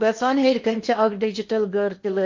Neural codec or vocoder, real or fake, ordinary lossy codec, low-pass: codec, 16 kHz in and 24 kHz out, 0.8 kbps, FocalCodec, streaming, 65536 codes; fake; MP3, 48 kbps; 7.2 kHz